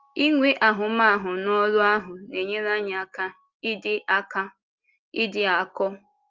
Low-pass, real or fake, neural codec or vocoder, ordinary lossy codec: 7.2 kHz; real; none; Opus, 32 kbps